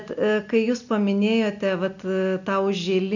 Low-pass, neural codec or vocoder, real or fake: 7.2 kHz; none; real